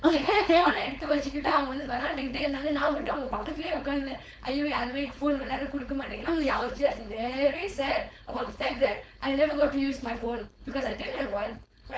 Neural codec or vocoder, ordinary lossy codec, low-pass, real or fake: codec, 16 kHz, 4.8 kbps, FACodec; none; none; fake